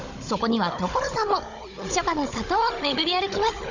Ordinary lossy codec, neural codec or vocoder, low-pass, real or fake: Opus, 64 kbps; codec, 16 kHz, 16 kbps, FunCodec, trained on Chinese and English, 50 frames a second; 7.2 kHz; fake